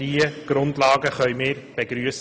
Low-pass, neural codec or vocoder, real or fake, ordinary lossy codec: none; none; real; none